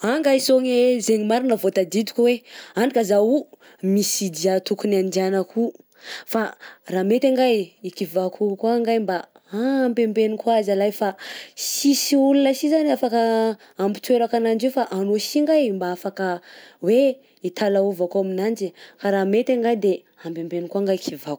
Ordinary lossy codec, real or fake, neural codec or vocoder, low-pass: none; real; none; none